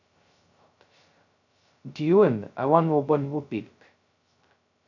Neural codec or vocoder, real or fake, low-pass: codec, 16 kHz, 0.2 kbps, FocalCodec; fake; 7.2 kHz